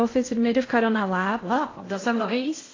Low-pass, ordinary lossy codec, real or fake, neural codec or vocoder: 7.2 kHz; AAC, 32 kbps; fake; codec, 16 kHz in and 24 kHz out, 0.6 kbps, FocalCodec, streaming, 2048 codes